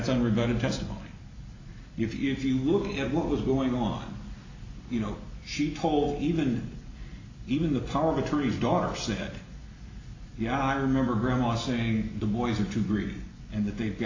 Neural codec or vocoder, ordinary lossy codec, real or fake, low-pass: none; AAC, 48 kbps; real; 7.2 kHz